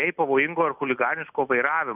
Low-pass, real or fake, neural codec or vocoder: 3.6 kHz; real; none